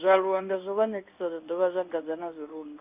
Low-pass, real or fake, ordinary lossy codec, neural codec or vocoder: 3.6 kHz; fake; Opus, 64 kbps; codec, 16 kHz in and 24 kHz out, 1 kbps, XY-Tokenizer